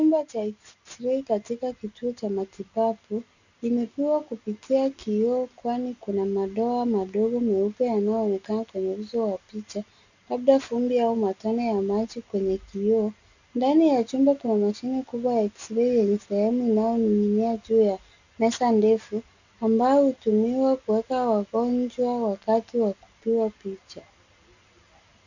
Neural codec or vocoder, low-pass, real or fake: none; 7.2 kHz; real